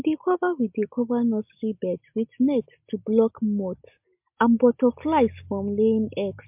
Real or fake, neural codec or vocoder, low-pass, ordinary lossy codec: real; none; 3.6 kHz; MP3, 32 kbps